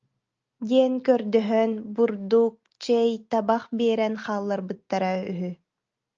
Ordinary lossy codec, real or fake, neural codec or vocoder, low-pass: Opus, 24 kbps; real; none; 7.2 kHz